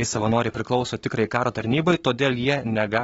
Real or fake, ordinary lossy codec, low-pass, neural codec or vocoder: fake; AAC, 24 kbps; 19.8 kHz; codec, 44.1 kHz, 7.8 kbps, Pupu-Codec